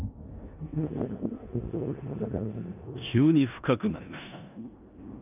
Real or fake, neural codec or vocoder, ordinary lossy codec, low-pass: fake; codec, 16 kHz in and 24 kHz out, 0.9 kbps, LongCat-Audio-Codec, four codebook decoder; none; 3.6 kHz